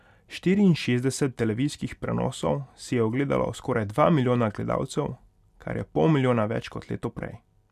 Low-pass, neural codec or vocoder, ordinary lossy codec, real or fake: 14.4 kHz; none; none; real